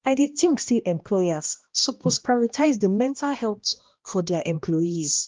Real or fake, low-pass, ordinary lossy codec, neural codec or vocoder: fake; 7.2 kHz; Opus, 32 kbps; codec, 16 kHz, 1 kbps, X-Codec, HuBERT features, trained on balanced general audio